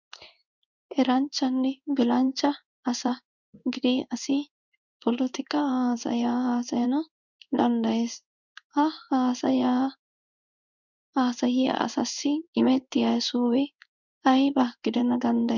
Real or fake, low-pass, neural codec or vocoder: fake; 7.2 kHz; codec, 16 kHz in and 24 kHz out, 1 kbps, XY-Tokenizer